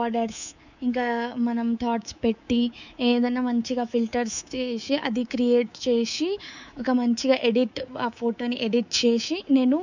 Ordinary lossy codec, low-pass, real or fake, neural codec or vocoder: none; 7.2 kHz; fake; codec, 24 kHz, 3.1 kbps, DualCodec